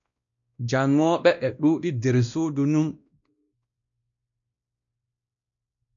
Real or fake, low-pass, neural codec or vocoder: fake; 7.2 kHz; codec, 16 kHz, 1 kbps, X-Codec, WavLM features, trained on Multilingual LibriSpeech